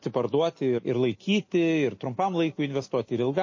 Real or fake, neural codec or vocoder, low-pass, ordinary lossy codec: real; none; 7.2 kHz; MP3, 32 kbps